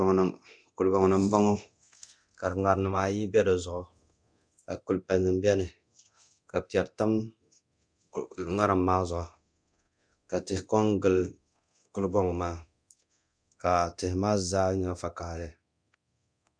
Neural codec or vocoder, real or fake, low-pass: codec, 24 kHz, 0.9 kbps, DualCodec; fake; 9.9 kHz